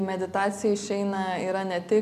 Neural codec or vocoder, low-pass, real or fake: none; 14.4 kHz; real